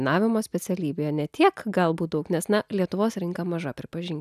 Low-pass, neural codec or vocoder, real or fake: 14.4 kHz; none; real